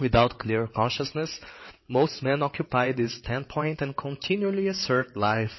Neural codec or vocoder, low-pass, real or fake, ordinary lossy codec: vocoder, 22.05 kHz, 80 mel bands, WaveNeXt; 7.2 kHz; fake; MP3, 24 kbps